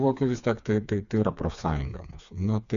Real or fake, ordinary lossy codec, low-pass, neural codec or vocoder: fake; MP3, 96 kbps; 7.2 kHz; codec, 16 kHz, 4 kbps, FreqCodec, smaller model